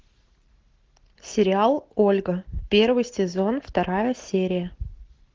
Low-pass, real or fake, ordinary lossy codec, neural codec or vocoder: 7.2 kHz; real; Opus, 16 kbps; none